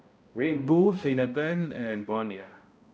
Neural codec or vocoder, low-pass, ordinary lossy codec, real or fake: codec, 16 kHz, 0.5 kbps, X-Codec, HuBERT features, trained on balanced general audio; none; none; fake